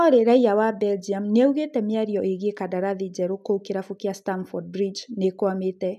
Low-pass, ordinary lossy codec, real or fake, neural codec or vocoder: 14.4 kHz; none; real; none